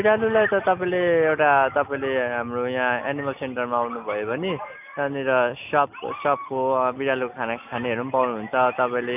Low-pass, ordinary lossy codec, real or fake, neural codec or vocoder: 3.6 kHz; none; real; none